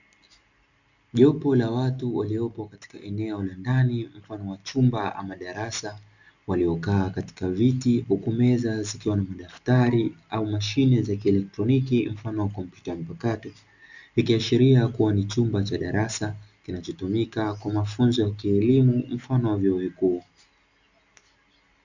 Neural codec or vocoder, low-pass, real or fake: none; 7.2 kHz; real